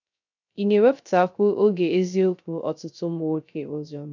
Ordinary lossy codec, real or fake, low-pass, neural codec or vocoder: none; fake; 7.2 kHz; codec, 16 kHz, 0.3 kbps, FocalCodec